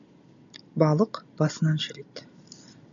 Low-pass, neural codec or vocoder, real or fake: 7.2 kHz; none; real